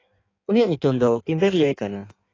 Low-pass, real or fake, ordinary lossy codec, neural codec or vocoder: 7.2 kHz; fake; AAC, 32 kbps; codec, 16 kHz in and 24 kHz out, 1.1 kbps, FireRedTTS-2 codec